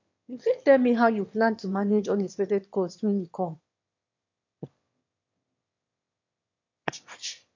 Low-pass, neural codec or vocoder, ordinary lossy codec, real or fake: 7.2 kHz; autoencoder, 22.05 kHz, a latent of 192 numbers a frame, VITS, trained on one speaker; MP3, 48 kbps; fake